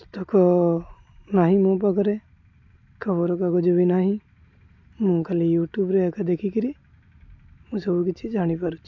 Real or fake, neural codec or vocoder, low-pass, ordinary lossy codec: real; none; 7.2 kHz; MP3, 48 kbps